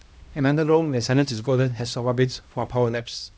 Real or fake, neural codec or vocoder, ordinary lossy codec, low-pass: fake; codec, 16 kHz, 1 kbps, X-Codec, HuBERT features, trained on LibriSpeech; none; none